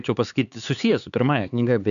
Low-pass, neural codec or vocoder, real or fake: 7.2 kHz; codec, 16 kHz, 4 kbps, X-Codec, HuBERT features, trained on LibriSpeech; fake